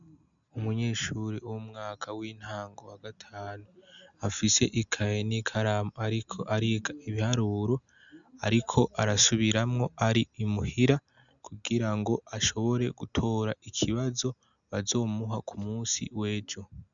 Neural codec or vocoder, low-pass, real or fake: none; 7.2 kHz; real